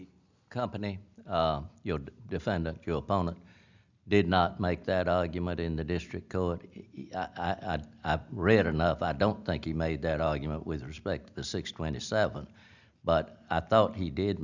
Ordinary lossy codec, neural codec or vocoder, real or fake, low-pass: Opus, 64 kbps; none; real; 7.2 kHz